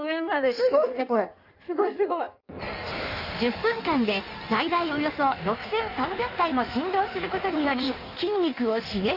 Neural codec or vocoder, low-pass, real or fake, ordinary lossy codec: codec, 16 kHz in and 24 kHz out, 1.1 kbps, FireRedTTS-2 codec; 5.4 kHz; fake; none